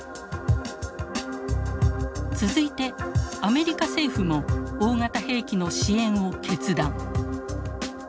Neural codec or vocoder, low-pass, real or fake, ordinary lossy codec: none; none; real; none